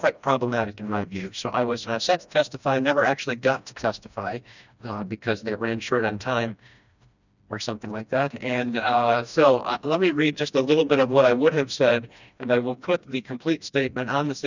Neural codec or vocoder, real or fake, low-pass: codec, 16 kHz, 1 kbps, FreqCodec, smaller model; fake; 7.2 kHz